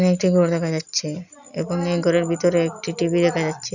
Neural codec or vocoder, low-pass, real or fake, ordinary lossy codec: none; 7.2 kHz; real; none